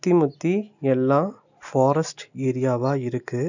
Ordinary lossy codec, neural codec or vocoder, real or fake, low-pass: none; none; real; 7.2 kHz